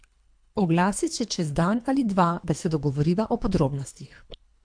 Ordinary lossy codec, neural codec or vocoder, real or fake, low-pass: MP3, 64 kbps; codec, 24 kHz, 3 kbps, HILCodec; fake; 9.9 kHz